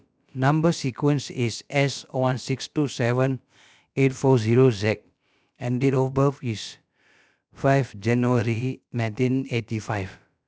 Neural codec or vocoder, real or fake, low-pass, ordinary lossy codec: codec, 16 kHz, about 1 kbps, DyCAST, with the encoder's durations; fake; none; none